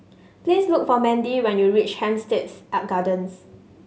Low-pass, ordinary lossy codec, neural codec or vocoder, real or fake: none; none; none; real